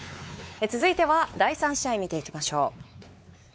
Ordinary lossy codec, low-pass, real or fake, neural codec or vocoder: none; none; fake; codec, 16 kHz, 4 kbps, X-Codec, WavLM features, trained on Multilingual LibriSpeech